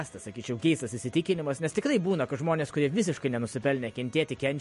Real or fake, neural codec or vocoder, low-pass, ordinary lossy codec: real; none; 14.4 kHz; MP3, 48 kbps